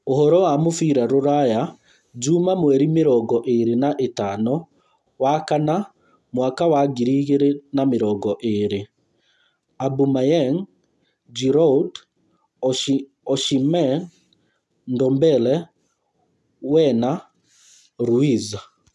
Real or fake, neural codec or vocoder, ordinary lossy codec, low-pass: real; none; none; none